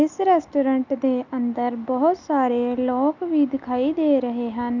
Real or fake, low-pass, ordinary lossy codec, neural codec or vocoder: real; 7.2 kHz; none; none